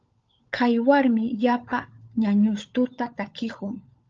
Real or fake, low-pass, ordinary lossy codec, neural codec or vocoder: fake; 7.2 kHz; Opus, 24 kbps; codec, 16 kHz, 16 kbps, FunCodec, trained on LibriTTS, 50 frames a second